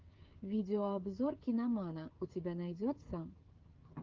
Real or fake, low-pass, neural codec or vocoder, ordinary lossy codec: fake; 7.2 kHz; codec, 16 kHz, 16 kbps, FreqCodec, smaller model; Opus, 32 kbps